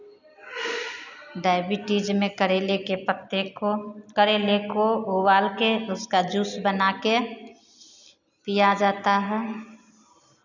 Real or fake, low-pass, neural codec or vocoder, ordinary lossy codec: real; 7.2 kHz; none; none